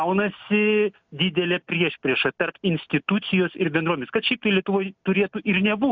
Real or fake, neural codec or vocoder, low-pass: real; none; 7.2 kHz